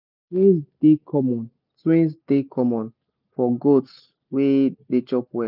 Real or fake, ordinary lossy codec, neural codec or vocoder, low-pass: real; none; none; 5.4 kHz